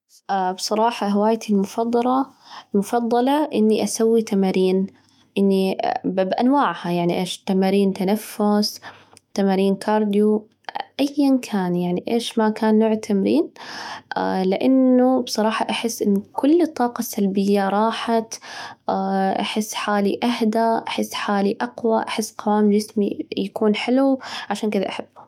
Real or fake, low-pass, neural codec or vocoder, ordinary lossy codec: fake; 19.8 kHz; autoencoder, 48 kHz, 128 numbers a frame, DAC-VAE, trained on Japanese speech; MP3, 96 kbps